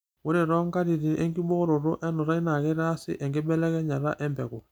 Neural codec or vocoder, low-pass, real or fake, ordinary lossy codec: none; none; real; none